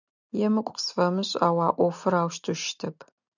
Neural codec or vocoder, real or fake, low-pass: none; real; 7.2 kHz